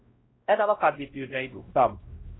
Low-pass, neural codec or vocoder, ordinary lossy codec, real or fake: 7.2 kHz; codec, 16 kHz, 0.5 kbps, X-Codec, WavLM features, trained on Multilingual LibriSpeech; AAC, 16 kbps; fake